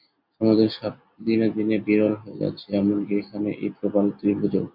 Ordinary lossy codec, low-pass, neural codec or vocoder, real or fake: AAC, 48 kbps; 5.4 kHz; none; real